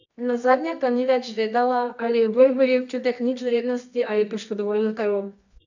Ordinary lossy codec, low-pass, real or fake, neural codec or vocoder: none; 7.2 kHz; fake; codec, 24 kHz, 0.9 kbps, WavTokenizer, medium music audio release